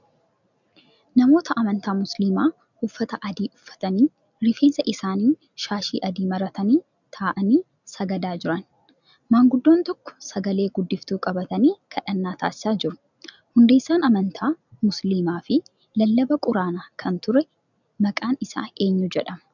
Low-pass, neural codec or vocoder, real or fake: 7.2 kHz; none; real